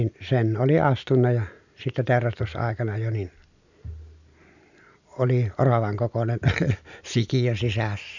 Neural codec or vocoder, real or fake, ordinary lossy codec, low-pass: none; real; none; 7.2 kHz